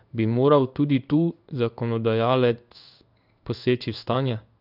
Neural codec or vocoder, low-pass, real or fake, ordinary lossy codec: codec, 16 kHz in and 24 kHz out, 1 kbps, XY-Tokenizer; 5.4 kHz; fake; none